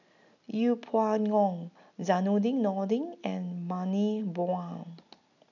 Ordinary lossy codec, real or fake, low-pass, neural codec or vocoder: none; real; 7.2 kHz; none